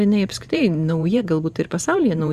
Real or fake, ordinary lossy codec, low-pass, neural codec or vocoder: fake; Opus, 64 kbps; 14.4 kHz; vocoder, 44.1 kHz, 128 mel bands every 512 samples, BigVGAN v2